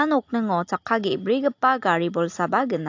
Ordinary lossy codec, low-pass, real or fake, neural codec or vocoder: AAC, 48 kbps; 7.2 kHz; real; none